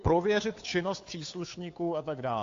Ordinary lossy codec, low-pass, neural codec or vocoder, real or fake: AAC, 48 kbps; 7.2 kHz; codec, 16 kHz, 2 kbps, FunCodec, trained on Chinese and English, 25 frames a second; fake